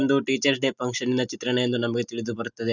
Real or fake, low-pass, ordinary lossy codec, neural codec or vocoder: real; 7.2 kHz; none; none